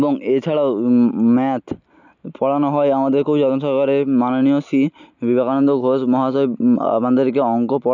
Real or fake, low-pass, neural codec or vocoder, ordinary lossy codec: real; 7.2 kHz; none; none